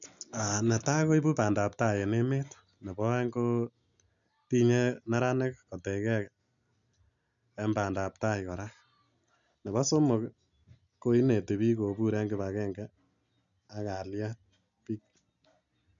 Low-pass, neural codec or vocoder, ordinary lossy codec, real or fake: 7.2 kHz; none; none; real